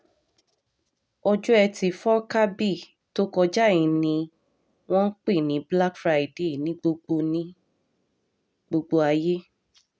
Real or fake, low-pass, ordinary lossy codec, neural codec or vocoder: real; none; none; none